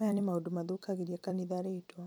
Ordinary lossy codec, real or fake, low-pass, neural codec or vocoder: none; fake; none; vocoder, 44.1 kHz, 128 mel bands every 256 samples, BigVGAN v2